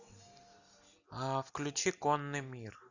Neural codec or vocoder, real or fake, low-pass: none; real; 7.2 kHz